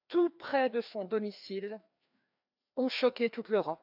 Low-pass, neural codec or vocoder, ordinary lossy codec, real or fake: 5.4 kHz; codec, 16 kHz, 2 kbps, FreqCodec, larger model; none; fake